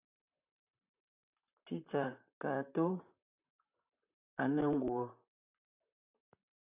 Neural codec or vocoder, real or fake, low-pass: vocoder, 44.1 kHz, 128 mel bands, Pupu-Vocoder; fake; 3.6 kHz